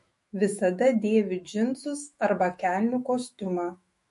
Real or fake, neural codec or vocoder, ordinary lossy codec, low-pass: fake; autoencoder, 48 kHz, 128 numbers a frame, DAC-VAE, trained on Japanese speech; MP3, 48 kbps; 14.4 kHz